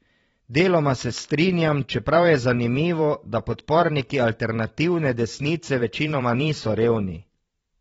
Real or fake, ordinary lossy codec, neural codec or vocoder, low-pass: fake; AAC, 24 kbps; vocoder, 44.1 kHz, 128 mel bands every 512 samples, BigVGAN v2; 19.8 kHz